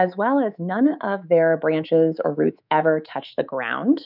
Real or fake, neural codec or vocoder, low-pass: fake; codec, 24 kHz, 3.1 kbps, DualCodec; 5.4 kHz